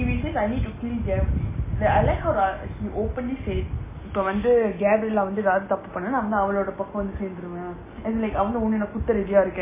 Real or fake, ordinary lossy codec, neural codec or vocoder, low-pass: real; MP3, 16 kbps; none; 3.6 kHz